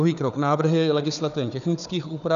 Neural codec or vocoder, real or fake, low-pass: codec, 16 kHz, 4 kbps, FunCodec, trained on Chinese and English, 50 frames a second; fake; 7.2 kHz